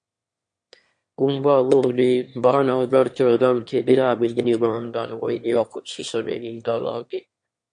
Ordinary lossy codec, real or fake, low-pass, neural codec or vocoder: MP3, 48 kbps; fake; 9.9 kHz; autoencoder, 22.05 kHz, a latent of 192 numbers a frame, VITS, trained on one speaker